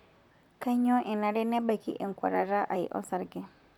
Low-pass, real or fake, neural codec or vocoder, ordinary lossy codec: 19.8 kHz; fake; vocoder, 44.1 kHz, 128 mel bands, Pupu-Vocoder; none